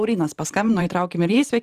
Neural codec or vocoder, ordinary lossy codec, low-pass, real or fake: vocoder, 44.1 kHz, 128 mel bands every 512 samples, BigVGAN v2; Opus, 32 kbps; 14.4 kHz; fake